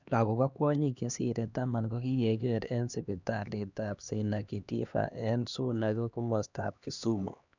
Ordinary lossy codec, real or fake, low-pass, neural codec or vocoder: none; fake; 7.2 kHz; codec, 16 kHz, 2 kbps, X-Codec, HuBERT features, trained on LibriSpeech